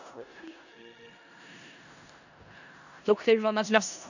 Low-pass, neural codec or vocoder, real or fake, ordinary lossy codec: 7.2 kHz; codec, 16 kHz in and 24 kHz out, 0.4 kbps, LongCat-Audio-Codec, four codebook decoder; fake; none